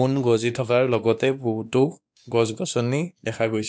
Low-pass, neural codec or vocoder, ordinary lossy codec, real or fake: none; codec, 16 kHz, 2 kbps, X-Codec, WavLM features, trained on Multilingual LibriSpeech; none; fake